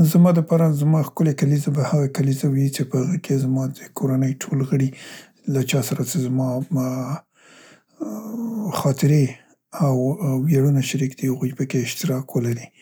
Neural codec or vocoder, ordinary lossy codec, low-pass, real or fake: none; none; none; real